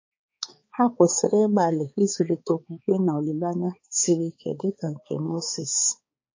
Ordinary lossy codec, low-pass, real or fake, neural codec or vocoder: MP3, 32 kbps; 7.2 kHz; fake; codec, 16 kHz, 4 kbps, X-Codec, HuBERT features, trained on balanced general audio